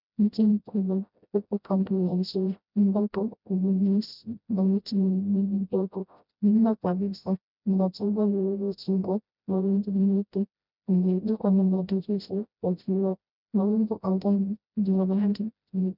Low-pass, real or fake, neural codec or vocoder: 5.4 kHz; fake; codec, 16 kHz, 0.5 kbps, FreqCodec, smaller model